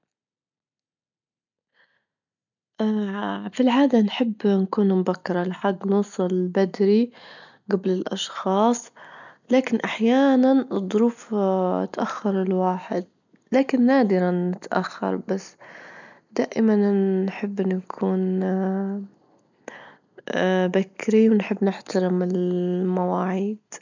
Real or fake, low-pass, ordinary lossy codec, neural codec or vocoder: real; 7.2 kHz; none; none